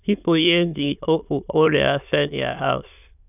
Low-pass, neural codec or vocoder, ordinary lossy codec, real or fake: 3.6 kHz; autoencoder, 22.05 kHz, a latent of 192 numbers a frame, VITS, trained on many speakers; none; fake